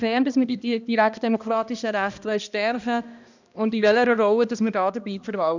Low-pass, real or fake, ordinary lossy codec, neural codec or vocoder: 7.2 kHz; fake; none; codec, 16 kHz, 1 kbps, X-Codec, HuBERT features, trained on balanced general audio